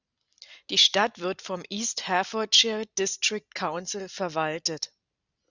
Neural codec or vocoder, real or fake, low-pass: none; real; 7.2 kHz